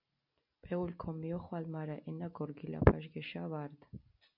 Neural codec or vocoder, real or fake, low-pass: none; real; 5.4 kHz